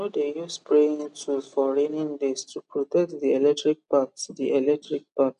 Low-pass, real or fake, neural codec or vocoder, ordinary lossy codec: 10.8 kHz; real; none; MP3, 96 kbps